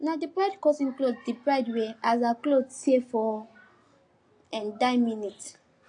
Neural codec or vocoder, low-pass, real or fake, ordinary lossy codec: none; 9.9 kHz; real; AAC, 48 kbps